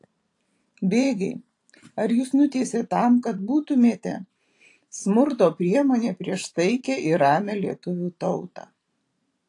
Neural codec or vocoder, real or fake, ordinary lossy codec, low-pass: vocoder, 44.1 kHz, 128 mel bands every 512 samples, BigVGAN v2; fake; AAC, 48 kbps; 10.8 kHz